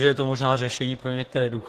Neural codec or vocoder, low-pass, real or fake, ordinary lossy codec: codec, 32 kHz, 1.9 kbps, SNAC; 14.4 kHz; fake; Opus, 16 kbps